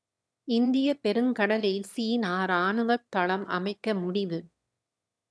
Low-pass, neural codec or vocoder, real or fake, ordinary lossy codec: none; autoencoder, 22.05 kHz, a latent of 192 numbers a frame, VITS, trained on one speaker; fake; none